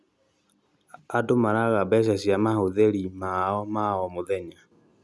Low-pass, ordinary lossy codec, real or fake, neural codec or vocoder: none; none; real; none